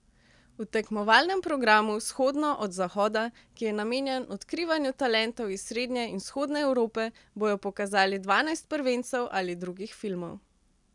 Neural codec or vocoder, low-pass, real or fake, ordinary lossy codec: none; 10.8 kHz; real; none